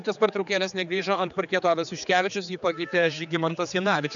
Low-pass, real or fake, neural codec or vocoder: 7.2 kHz; fake; codec, 16 kHz, 4 kbps, X-Codec, HuBERT features, trained on general audio